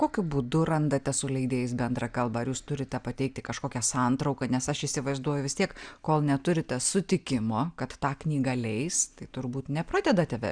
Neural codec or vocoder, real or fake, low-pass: none; real; 9.9 kHz